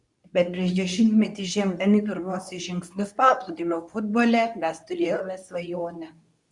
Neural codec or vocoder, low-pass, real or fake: codec, 24 kHz, 0.9 kbps, WavTokenizer, medium speech release version 2; 10.8 kHz; fake